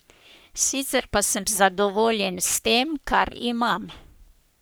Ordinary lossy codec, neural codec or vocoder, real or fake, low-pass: none; codec, 44.1 kHz, 3.4 kbps, Pupu-Codec; fake; none